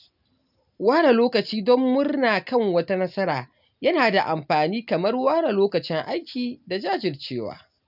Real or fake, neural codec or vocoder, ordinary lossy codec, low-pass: real; none; none; 5.4 kHz